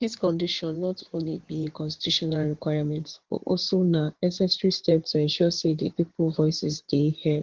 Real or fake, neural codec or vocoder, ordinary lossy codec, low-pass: fake; codec, 16 kHz in and 24 kHz out, 2.2 kbps, FireRedTTS-2 codec; Opus, 16 kbps; 7.2 kHz